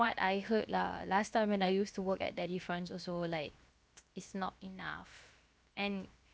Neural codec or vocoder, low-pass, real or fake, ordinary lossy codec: codec, 16 kHz, 0.7 kbps, FocalCodec; none; fake; none